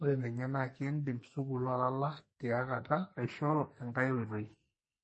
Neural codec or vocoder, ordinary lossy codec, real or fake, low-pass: codec, 44.1 kHz, 2.6 kbps, SNAC; MP3, 32 kbps; fake; 10.8 kHz